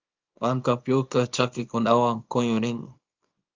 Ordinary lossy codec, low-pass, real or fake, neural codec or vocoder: Opus, 24 kbps; 7.2 kHz; fake; codec, 24 kHz, 0.9 kbps, WavTokenizer, small release